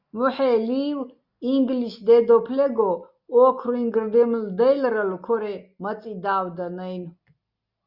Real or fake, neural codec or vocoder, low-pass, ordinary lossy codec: real; none; 5.4 kHz; Opus, 64 kbps